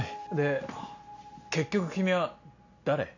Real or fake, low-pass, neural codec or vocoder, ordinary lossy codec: real; 7.2 kHz; none; none